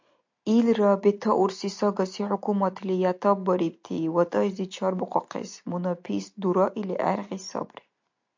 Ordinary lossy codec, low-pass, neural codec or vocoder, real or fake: MP3, 48 kbps; 7.2 kHz; none; real